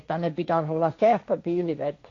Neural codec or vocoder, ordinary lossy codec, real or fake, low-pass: codec, 16 kHz, 1.1 kbps, Voila-Tokenizer; AAC, 48 kbps; fake; 7.2 kHz